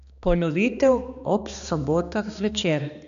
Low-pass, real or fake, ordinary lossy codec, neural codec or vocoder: 7.2 kHz; fake; none; codec, 16 kHz, 2 kbps, X-Codec, HuBERT features, trained on balanced general audio